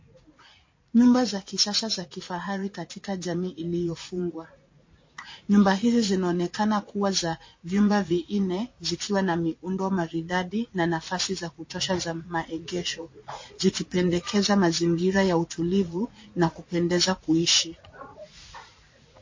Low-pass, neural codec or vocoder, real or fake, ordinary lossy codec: 7.2 kHz; vocoder, 44.1 kHz, 80 mel bands, Vocos; fake; MP3, 32 kbps